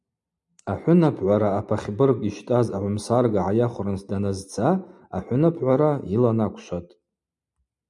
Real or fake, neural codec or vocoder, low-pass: real; none; 10.8 kHz